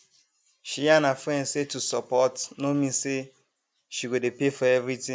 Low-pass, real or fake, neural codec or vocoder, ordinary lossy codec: none; real; none; none